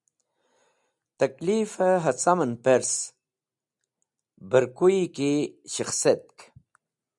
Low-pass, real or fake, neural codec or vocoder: 10.8 kHz; real; none